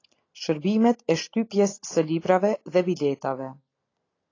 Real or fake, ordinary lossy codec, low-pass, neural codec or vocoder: real; AAC, 32 kbps; 7.2 kHz; none